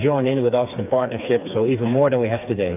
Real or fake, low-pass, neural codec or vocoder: fake; 3.6 kHz; codec, 16 kHz, 4 kbps, FreqCodec, smaller model